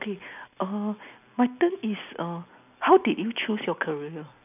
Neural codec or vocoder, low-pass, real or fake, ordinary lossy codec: none; 3.6 kHz; real; none